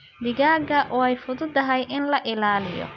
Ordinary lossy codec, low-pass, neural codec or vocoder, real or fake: none; 7.2 kHz; none; real